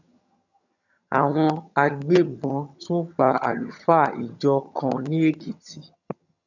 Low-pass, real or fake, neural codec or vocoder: 7.2 kHz; fake; vocoder, 22.05 kHz, 80 mel bands, HiFi-GAN